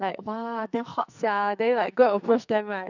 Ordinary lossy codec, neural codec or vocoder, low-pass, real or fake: none; codec, 44.1 kHz, 2.6 kbps, SNAC; 7.2 kHz; fake